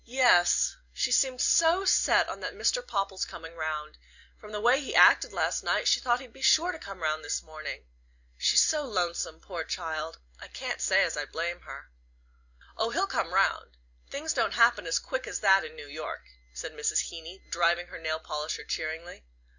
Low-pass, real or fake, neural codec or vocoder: 7.2 kHz; real; none